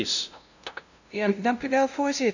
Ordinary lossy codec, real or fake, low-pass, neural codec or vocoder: none; fake; 7.2 kHz; codec, 16 kHz, 0.5 kbps, FunCodec, trained on LibriTTS, 25 frames a second